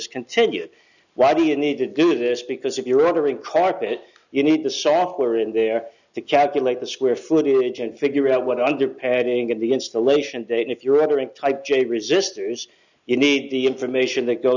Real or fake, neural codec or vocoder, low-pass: real; none; 7.2 kHz